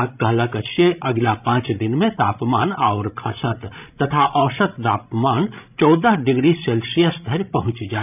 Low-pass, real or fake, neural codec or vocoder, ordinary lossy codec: 3.6 kHz; fake; codec, 16 kHz, 16 kbps, FreqCodec, larger model; none